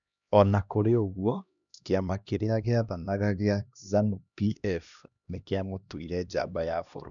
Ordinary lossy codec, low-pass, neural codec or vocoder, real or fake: none; 7.2 kHz; codec, 16 kHz, 1 kbps, X-Codec, HuBERT features, trained on LibriSpeech; fake